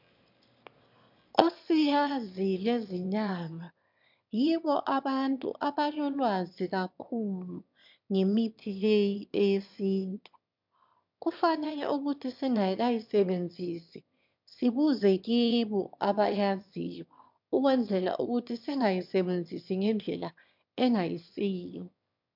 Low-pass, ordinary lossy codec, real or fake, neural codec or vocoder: 5.4 kHz; MP3, 48 kbps; fake; autoencoder, 22.05 kHz, a latent of 192 numbers a frame, VITS, trained on one speaker